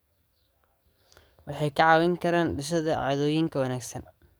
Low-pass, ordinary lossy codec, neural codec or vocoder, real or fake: none; none; codec, 44.1 kHz, 7.8 kbps, DAC; fake